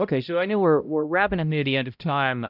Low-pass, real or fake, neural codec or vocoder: 5.4 kHz; fake; codec, 16 kHz, 0.5 kbps, X-Codec, HuBERT features, trained on balanced general audio